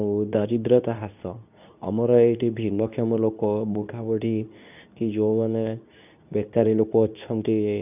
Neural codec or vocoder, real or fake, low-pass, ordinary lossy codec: codec, 24 kHz, 0.9 kbps, WavTokenizer, medium speech release version 2; fake; 3.6 kHz; none